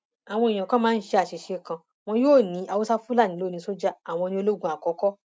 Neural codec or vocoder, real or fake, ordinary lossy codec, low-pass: none; real; none; none